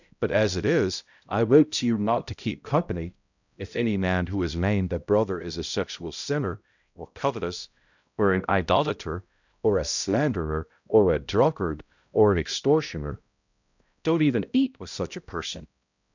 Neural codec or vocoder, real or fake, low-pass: codec, 16 kHz, 0.5 kbps, X-Codec, HuBERT features, trained on balanced general audio; fake; 7.2 kHz